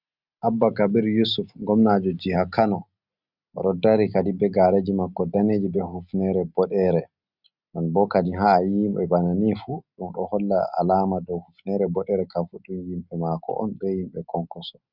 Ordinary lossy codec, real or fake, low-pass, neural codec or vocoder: Opus, 64 kbps; real; 5.4 kHz; none